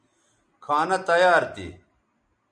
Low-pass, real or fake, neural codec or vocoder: 9.9 kHz; real; none